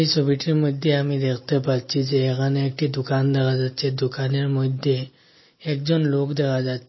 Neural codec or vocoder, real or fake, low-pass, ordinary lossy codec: none; real; 7.2 kHz; MP3, 24 kbps